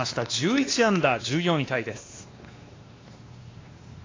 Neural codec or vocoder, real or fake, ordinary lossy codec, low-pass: codec, 16 kHz, 2 kbps, X-Codec, WavLM features, trained on Multilingual LibriSpeech; fake; AAC, 32 kbps; 7.2 kHz